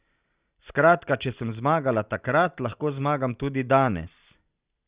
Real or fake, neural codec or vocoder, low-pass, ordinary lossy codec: real; none; 3.6 kHz; Opus, 64 kbps